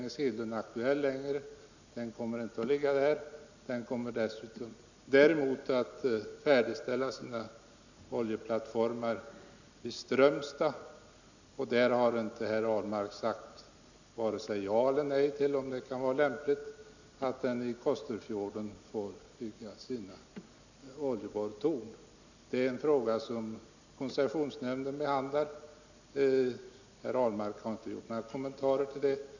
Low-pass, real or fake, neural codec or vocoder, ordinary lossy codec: 7.2 kHz; real; none; none